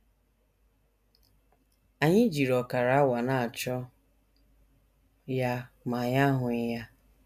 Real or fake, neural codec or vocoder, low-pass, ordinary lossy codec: real; none; 14.4 kHz; none